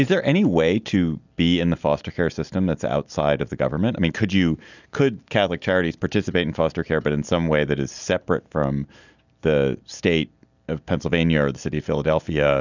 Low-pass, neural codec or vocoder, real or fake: 7.2 kHz; none; real